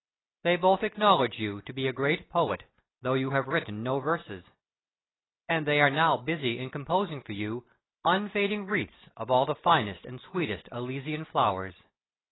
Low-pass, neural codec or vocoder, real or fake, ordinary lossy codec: 7.2 kHz; none; real; AAC, 16 kbps